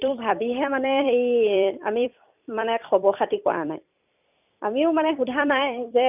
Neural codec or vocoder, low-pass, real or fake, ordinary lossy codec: vocoder, 44.1 kHz, 128 mel bands every 256 samples, BigVGAN v2; 3.6 kHz; fake; none